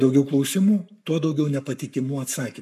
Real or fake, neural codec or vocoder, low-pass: fake; codec, 44.1 kHz, 7.8 kbps, Pupu-Codec; 14.4 kHz